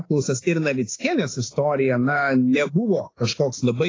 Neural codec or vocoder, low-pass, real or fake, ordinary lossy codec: autoencoder, 48 kHz, 32 numbers a frame, DAC-VAE, trained on Japanese speech; 7.2 kHz; fake; AAC, 32 kbps